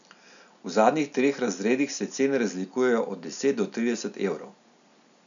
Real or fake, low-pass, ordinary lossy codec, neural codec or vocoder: real; 7.2 kHz; none; none